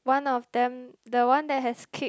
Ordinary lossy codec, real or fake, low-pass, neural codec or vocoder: none; real; none; none